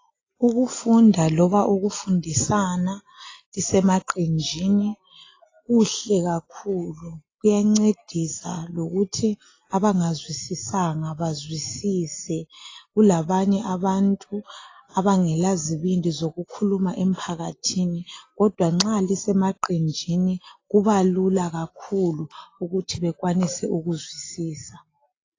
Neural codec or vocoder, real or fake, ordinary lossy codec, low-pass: none; real; AAC, 32 kbps; 7.2 kHz